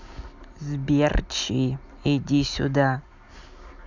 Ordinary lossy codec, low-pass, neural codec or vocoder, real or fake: none; 7.2 kHz; none; real